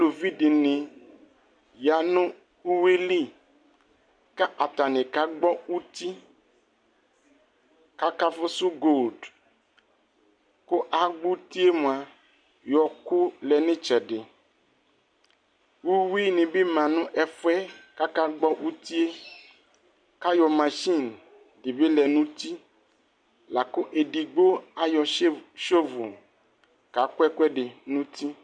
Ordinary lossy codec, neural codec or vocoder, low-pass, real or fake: MP3, 64 kbps; none; 9.9 kHz; real